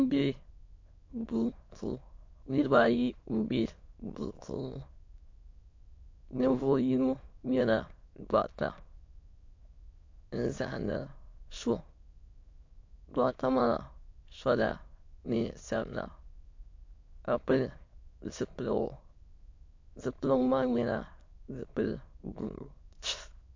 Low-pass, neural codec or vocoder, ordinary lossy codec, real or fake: 7.2 kHz; autoencoder, 22.05 kHz, a latent of 192 numbers a frame, VITS, trained on many speakers; MP3, 48 kbps; fake